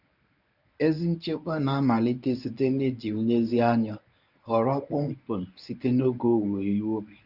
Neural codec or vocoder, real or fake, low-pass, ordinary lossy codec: codec, 24 kHz, 0.9 kbps, WavTokenizer, medium speech release version 1; fake; 5.4 kHz; none